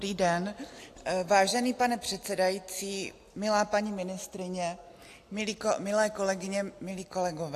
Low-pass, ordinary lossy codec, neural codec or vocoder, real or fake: 14.4 kHz; AAC, 64 kbps; none; real